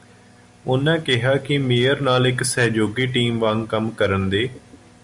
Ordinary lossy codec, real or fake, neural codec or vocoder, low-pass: MP3, 96 kbps; real; none; 10.8 kHz